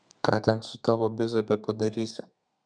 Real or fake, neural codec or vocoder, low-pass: fake; codec, 32 kHz, 1.9 kbps, SNAC; 9.9 kHz